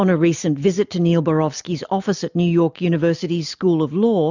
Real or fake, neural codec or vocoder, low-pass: real; none; 7.2 kHz